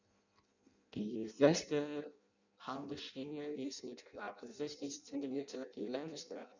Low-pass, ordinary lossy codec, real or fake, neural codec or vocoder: 7.2 kHz; none; fake; codec, 16 kHz in and 24 kHz out, 0.6 kbps, FireRedTTS-2 codec